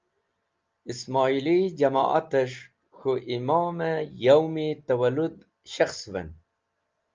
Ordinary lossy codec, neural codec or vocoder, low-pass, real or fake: Opus, 24 kbps; none; 7.2 kHz; real